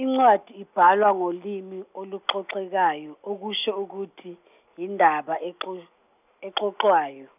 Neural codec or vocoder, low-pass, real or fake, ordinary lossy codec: none; 3.6 kHz; real; none